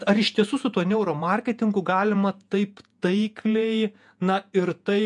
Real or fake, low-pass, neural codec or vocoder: fake; 10.8 kHz; vocoder, 48 kHz, 128 mel bands, Vocos